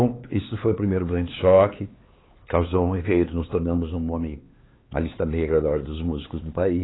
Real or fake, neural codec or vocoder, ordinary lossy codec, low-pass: fake; codec, 16 kHz, 4 kbps, X-Codec, HuBERT features, trained on LibriSpeech; AAC, 16 kbps; 7.2 kHz